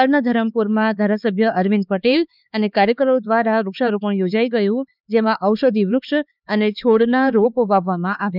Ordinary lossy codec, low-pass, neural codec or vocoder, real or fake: none; 5.4 kHz; codec, 16 kHz, 4 kbps, X-Codec, HuBERT features, trained on LibriSpeech; fake